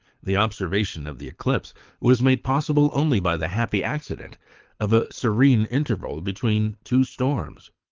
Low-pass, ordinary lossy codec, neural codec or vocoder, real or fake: 7.2 kHz; Opus, 32 kbps; codec, 24 kHz, 6 kbps, HILCodec; fake